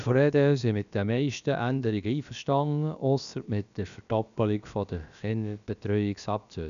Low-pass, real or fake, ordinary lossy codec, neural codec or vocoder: 7.2 kHz; fake; none; codec, 16 kHz, about 1 kbps, DyCAST, with the encoder's durations